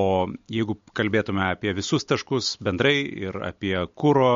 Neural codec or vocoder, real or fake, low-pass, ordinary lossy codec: none; real; 7.2 kHz; MP3, 48 kbps